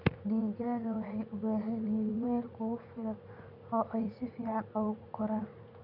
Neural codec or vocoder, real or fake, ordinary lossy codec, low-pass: vocoder, 44.1 kHz, 80 mel bands, Vocos; fake; none; 5.4 kHz